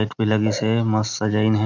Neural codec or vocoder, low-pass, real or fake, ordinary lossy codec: none; 7.2 kHz; real; none